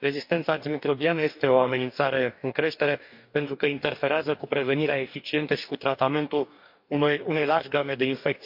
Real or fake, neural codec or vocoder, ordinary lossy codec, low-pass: fake; codec, 44.1 kHz, 2.6 kbps, DAC; MP3, 48 kbps; 5.4 kHz